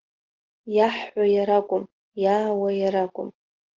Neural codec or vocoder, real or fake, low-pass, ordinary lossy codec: none; real; 7.2 kHz; Opus, 16 kbps